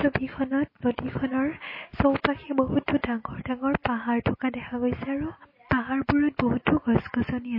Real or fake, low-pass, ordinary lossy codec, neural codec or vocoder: real; 5.4 kHz; MP3, 24 kbps; none